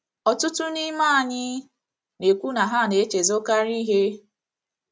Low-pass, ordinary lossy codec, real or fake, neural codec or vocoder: none; none; real; none